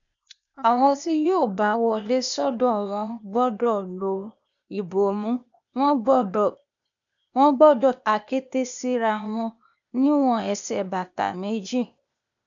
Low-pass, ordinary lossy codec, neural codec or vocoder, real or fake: 7.2 kHz; none; codec, 16 kHz, 0.8 kbps, ZipCodec; fake